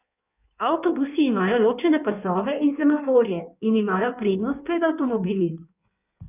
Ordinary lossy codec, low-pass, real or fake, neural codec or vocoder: none; 3.6 kHz; fake; codec, 16 kHz in and 24 kHz out, 1.1 kbps, FireRedTTS-2 codec